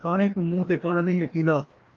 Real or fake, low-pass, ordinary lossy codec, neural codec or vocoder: fake; 7.2 kHz; Opus, 32 kbps; codec, 16 kHz, 1 kbps, FreqCodec, larger model